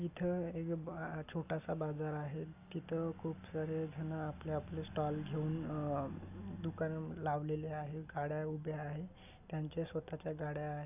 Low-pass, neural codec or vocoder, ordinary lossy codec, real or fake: 3.6 kHz; none; none; real